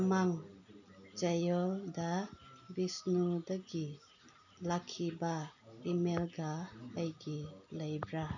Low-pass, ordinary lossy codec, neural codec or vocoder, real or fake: 7.2 kHz; none; none; real